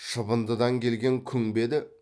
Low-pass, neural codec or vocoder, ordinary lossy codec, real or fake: none; none; none; real